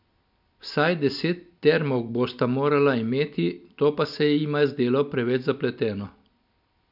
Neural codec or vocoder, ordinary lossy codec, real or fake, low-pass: none; none; real; 5.4 kHz